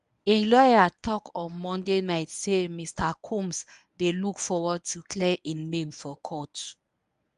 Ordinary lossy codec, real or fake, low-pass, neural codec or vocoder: none; fake; 10.8 kHz; codec, 24 kHz, 0.9 kbps, WavTokenizer, medium speech release version 1